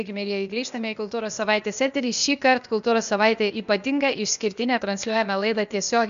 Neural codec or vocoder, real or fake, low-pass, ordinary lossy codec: codec, 16 kHz, 0.8 kbps, ZipCodec; fake; 7.2 kHz; AAC, 96 kbps